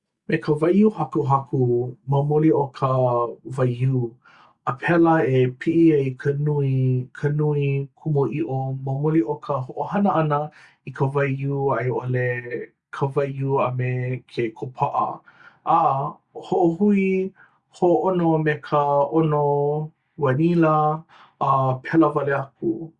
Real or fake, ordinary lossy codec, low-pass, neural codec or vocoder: real; Opus, 64 kbps; 10.8 kHz; none